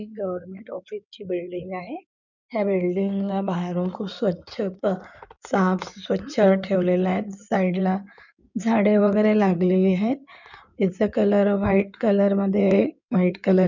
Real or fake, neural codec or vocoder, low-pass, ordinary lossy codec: fake; codec, 16 kHz in and 24 kHz out, 2.2 kbps, FireRedTTS-2 codec; 7.2 kHz; none